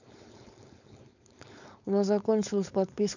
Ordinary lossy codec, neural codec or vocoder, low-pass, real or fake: none; codec, 16 kHz, 4.8 kbps, FACodec; 7.2 kHz; fake